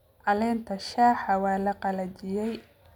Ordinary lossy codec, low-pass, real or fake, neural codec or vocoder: none; 19.8 kHz; real; none